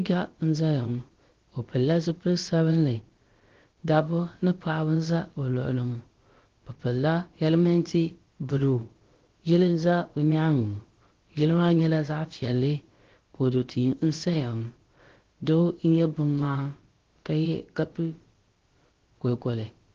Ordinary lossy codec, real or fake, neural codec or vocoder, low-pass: Opus, 16 kbps; fake; codec, 16 kHz, about 1 kbps, DyCAST, with the encoder's durations; 7.2 kHz